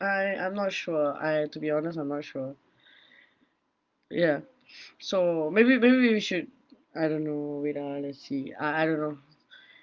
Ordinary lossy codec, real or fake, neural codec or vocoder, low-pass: Opus, 24 kbps; real; none; 7.2 kHz